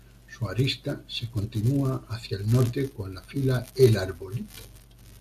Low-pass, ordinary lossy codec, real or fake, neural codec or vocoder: 14.4 kHz; MP3, 96 kbps; real; none